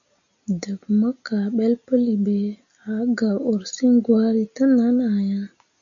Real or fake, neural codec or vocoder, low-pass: real; none; 7.2 kHz